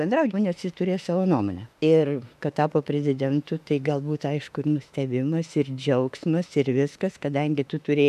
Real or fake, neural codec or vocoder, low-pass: fake; autoencoder, 48 kHz, 32 numbers a frame, DAC-VAE, trained on Japanese speech; 14.4 kHz